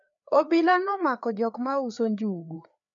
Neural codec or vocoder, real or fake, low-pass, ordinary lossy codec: codec, 16 kHz, 4 kbps, FreqCodec, larger model; fake; 7.2 kHz; none